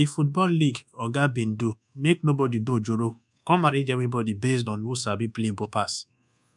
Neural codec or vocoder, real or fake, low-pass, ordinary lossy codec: codec, 24 kHz, 1.2 kbps, DualCodec; fake; 10.8 kHz; MP3, 96 kbps